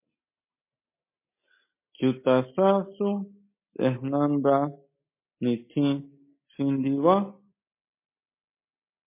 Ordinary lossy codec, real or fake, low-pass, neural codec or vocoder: MP3, 24 kbps; real; 3.6 kHz; none